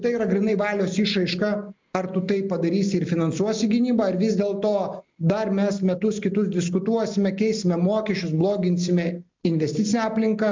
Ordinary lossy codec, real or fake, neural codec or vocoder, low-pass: MP3, 64 kbps; real; none; 7.2 kHz